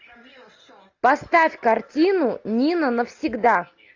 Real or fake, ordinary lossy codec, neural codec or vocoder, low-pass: real; AAC, 48 kbps; none; 7.2 kHz